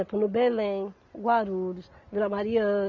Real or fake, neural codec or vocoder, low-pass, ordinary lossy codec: real; none; 7.2 kHz; none